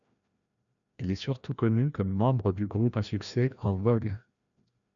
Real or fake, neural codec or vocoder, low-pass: fake; codec, 16 kHz, 1 kbps, FreqCodec, larger model; 7.2 kHz